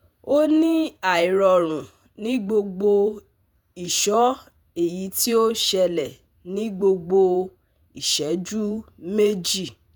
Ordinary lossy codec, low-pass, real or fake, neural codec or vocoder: none; none; fake; vocoder, 48 kHz, 128 mel bands, Vocos